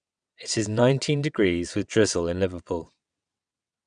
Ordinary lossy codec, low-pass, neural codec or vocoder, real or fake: none; 9.9 kHz; vocoder, 22.05 kHz, 80 mel bands, WaveNeXt; fake